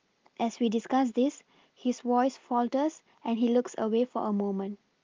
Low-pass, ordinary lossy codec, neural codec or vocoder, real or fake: 7.2 kHz; Opus, 32 kbps; none; real